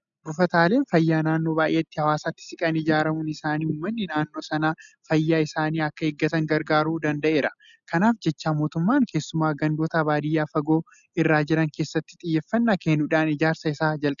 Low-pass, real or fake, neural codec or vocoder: 7.2 kHz; real; none